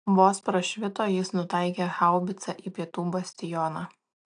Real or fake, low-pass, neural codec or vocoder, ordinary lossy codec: real; 9.9 kHz; none; AAC, 64 kbps